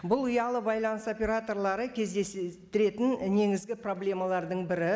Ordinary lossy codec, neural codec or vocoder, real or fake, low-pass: none; none; real; none